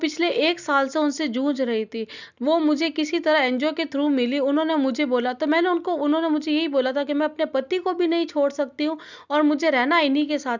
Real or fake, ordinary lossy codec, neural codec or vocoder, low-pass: real; none; none; 7.2 kHz